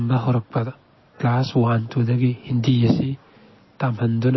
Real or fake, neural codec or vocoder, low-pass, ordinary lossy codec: real; none; 7.2 kHz; MP3, 24 kbps